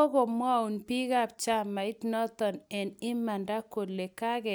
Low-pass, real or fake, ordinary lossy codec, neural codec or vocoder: none; real; none; none